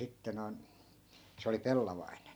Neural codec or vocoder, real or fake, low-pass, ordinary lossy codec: none; real; none; none